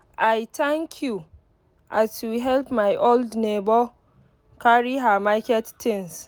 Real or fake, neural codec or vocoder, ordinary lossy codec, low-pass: real; none; none; none